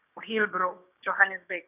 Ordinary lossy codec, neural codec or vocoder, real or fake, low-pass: none; codec, 16 kHz, 6 kbps, DAC; fake; 3.6 kHz